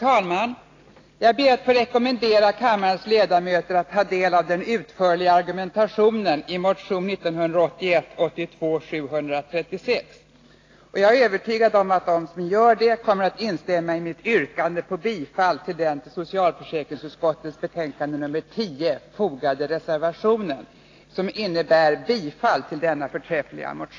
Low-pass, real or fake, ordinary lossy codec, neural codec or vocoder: 7.2 kHz; real; AAC, 32 kbps; none